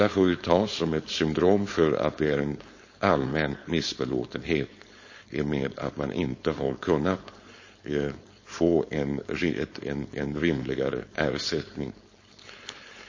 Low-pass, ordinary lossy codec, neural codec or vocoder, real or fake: 7.2 kHz; MP3, 32 kbps; codec, 16 kHz, 4.8 kbps, FACodec; fake